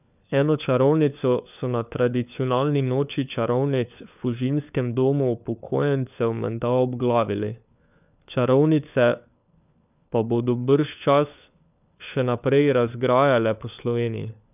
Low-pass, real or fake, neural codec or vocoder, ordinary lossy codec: 3.6 kHz; fake; codec, 16 kHz, 4 kbps, FunCodec, trained on LibriTTS, 50 frames a second; none